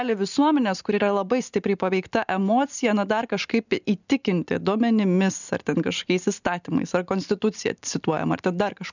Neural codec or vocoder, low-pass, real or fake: none; 7.2 kHz; real